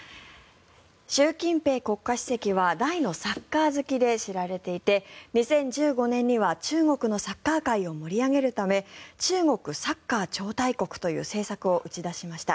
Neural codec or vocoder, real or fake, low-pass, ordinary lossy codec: none; real; none; none